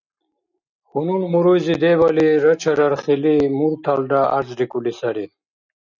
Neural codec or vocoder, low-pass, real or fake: none; 7.2 kHz; real